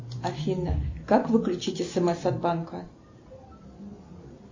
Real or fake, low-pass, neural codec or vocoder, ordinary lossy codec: real; 7.2 kHz; none; MP3, 32 kbps